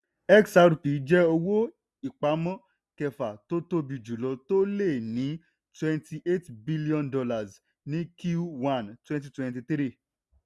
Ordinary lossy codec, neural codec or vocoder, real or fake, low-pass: none; none; real; none